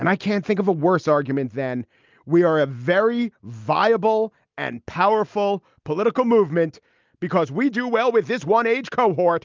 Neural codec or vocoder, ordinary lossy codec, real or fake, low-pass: none; Opus, 32 kbps; real; 7.2 kHz